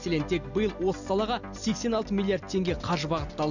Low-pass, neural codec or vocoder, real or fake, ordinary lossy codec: 7.2 kHz; none; real; none